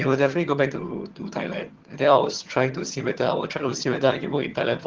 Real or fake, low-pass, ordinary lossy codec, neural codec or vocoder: fake; 7.2 kHz; Opus, 16 kbps; vocoder, 22.05 kHz, 80 mel bands, HiFi-GAN